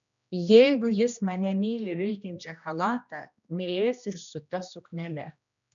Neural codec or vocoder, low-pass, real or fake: codec, 16 kHz, 1 kbps, X-Codec, HuBERT features, trained on general audio; 7.2 kHz; fake